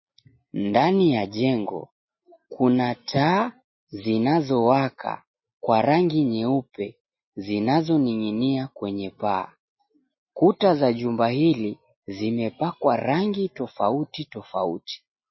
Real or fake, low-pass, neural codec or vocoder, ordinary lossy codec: real; 7.2 kHz; none; MP3, 24 kbps